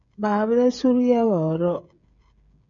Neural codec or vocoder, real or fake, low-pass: codec, 16 kHz, 8 kbps, FreqCodec, smaller model; fake; 7.2 kHz